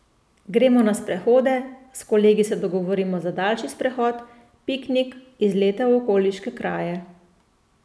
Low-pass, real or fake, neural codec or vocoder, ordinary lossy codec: none; real; none; none